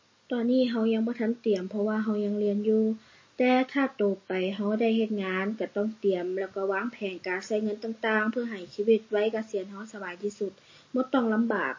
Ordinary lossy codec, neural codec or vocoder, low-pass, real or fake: MP3, 32 kbps; none; 7.2 kHz; real